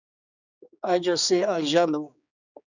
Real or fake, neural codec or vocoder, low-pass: fake; codec, 16 kHz, 2 kbps, X-Codec, HuBERT features, trained on general audio; 7.2 kHz